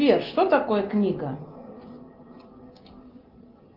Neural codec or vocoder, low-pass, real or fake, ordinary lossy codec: none; 5.4 kHz; real; Opus, 32 kbps